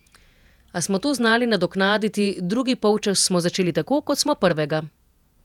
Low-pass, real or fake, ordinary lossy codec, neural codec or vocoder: 19.8 kHz; fake; none; vocoder, 48 kHz, 128 mel bands, Vocos